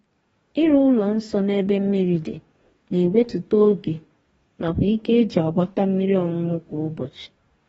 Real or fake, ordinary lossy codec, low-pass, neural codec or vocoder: fake; AAC, 24 kbps; 19.8 kHz; codec, 44.1 kHz, 2.6 kbps, DAC